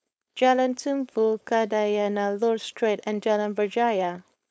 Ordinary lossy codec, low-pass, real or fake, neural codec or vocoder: none; none; fake; codec, 16 kHz, 4.8 kbps, FACodec